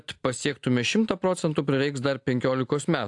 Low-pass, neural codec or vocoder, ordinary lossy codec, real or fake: 10.8 kHz; none; AAC, 64 kbps; real